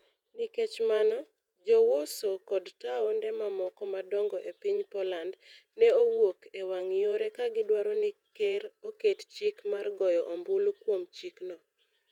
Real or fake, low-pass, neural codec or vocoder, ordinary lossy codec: fake; 19.8 kHz; vocoder, 48 kHz, 128 mel bands, Vocos; none